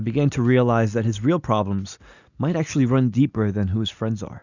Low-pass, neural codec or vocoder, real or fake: 7.2 kHz; none; real